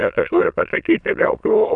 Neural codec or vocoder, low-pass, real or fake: autoencoder, 22.05 kHz, a latent of 192 numbers a frame, VITS, trained on many speakers; 9.9 kHz; fake